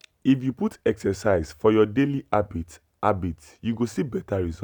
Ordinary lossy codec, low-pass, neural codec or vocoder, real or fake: none; none; none; real